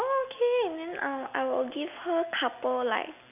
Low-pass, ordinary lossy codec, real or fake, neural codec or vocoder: 3.6 kHz; none; real; none